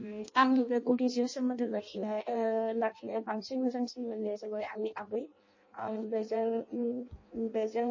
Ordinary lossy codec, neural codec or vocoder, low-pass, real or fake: MP3, 32 kbps; codec, 16 kHz in and 24 kHz out, 0.6 kbps, FireRedTTS-2 codec; 7.2 kHz; fake